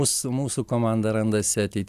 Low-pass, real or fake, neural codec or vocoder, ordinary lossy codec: 14.4 kHz; real; none; AAC, 96 kbps